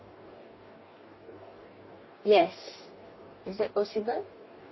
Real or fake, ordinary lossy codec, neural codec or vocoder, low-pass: fake; MP3, 24 kbps; codec, 44.1 kHz, 2.6 kbps, DAC; 7.2 kHz